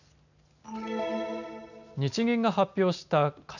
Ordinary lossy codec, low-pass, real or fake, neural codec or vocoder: none; 7.2 kHz; real; none